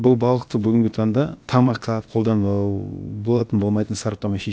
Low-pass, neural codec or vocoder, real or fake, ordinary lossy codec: none; codec, 16 kHz, about 1 kbps, DyCAST, with the encoder's durations; fake; none